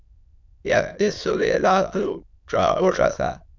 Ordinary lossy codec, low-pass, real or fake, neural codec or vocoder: MP3, 64 kbps; 7.2 kHz; fake; autoencoder, 22.05 kHz, a latent of 192 numbers a frame, VITS, trained on many speakers